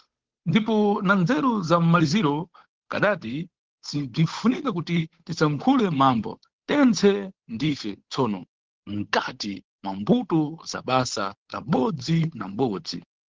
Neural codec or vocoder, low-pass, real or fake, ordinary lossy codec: codec, 16 kHz, 8 kbps, FunCodec, trained on Chinese and English, 25 frames a second; 7.2 kHz; fake; Opus, 16 kbps